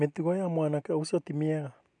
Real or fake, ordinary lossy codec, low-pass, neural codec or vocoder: real; none; 9.9 kHz; none